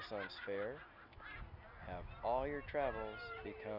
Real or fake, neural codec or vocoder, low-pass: real; none; 5.4 kHz